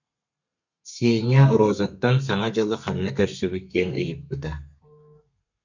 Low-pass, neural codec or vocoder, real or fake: 7.2 kHz; codec, 32 kHz, 1.9 kbps, SNAC; fake